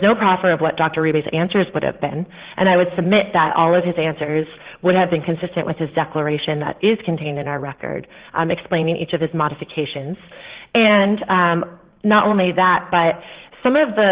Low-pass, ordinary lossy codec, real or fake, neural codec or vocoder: 3.6 kHz; Opus, 16 kbps; fake; vocoder, 44.1 kHz, 80 mel bands, Vocos